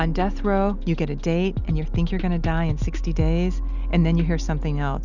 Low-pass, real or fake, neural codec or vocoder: 7.2 kHz; real; none